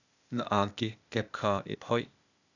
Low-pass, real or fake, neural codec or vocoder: 7.2 kHz; fake; codec, 16 kHz, 0.8 kbps, ZipCodec